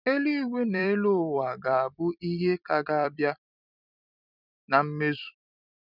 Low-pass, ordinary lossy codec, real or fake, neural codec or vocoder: 5.4 kHz; none; fake; vocoder, 44.1 kHz, 128 mel bands every 256 samples, BigVGAN v2